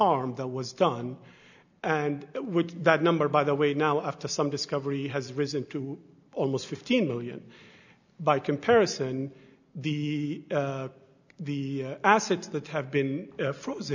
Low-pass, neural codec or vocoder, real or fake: 7.2 kHz; none; real